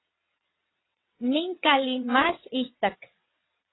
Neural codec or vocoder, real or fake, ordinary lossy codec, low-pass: vocoder, 22.05 kHz, 80 mel bands, WaveNeXt; fake; AAC, 16 kbps; 7.2 kHz